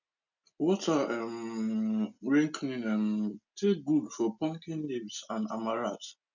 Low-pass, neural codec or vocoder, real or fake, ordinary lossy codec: 7.2 kHz; none; real; none